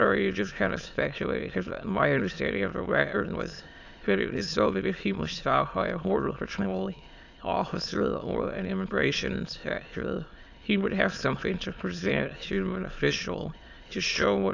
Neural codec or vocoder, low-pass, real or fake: autoencoder, 22.05 kHz, a latent of 192 numbers a frame, VITS, trained on many speakers; 7.2 kHz; fake